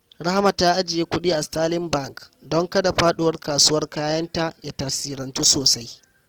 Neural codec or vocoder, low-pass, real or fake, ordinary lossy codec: none; 19.8 kHz; real; Opus, 16 kbps